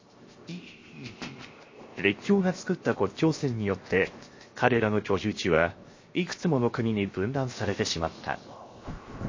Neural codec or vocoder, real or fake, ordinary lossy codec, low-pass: codec, 16 kHz, 0.7 kbps, FocalCodec; fake; MP3, 32 kbps; 7.2 kHz